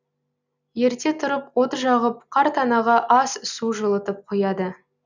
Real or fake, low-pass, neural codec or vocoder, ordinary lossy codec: real; 7.2 kHz; none; none